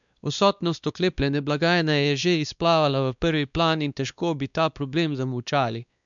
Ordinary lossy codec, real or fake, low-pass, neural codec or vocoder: none; fake; 7.2 kHz; codec, 16 kHz, 2 kbps, X-Codec, WavLM features, trained on Multilingual LibriSpeech